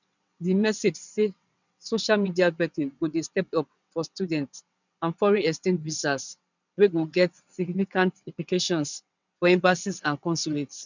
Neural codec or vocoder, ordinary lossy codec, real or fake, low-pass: vocoder, 22.05 kHz, 80 mel bands, WaveNeXt; none; fake; 7.2 kHz